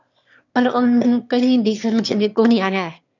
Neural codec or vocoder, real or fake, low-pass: autoencoder, 22.05 kHz, a latent of 192 numbers a frame, VITS, trained on one speaker; fake; 7.2 kHz